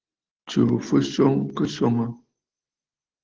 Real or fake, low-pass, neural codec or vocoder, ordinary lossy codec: real; 7.2 kHz; none; Opus, 16 kbps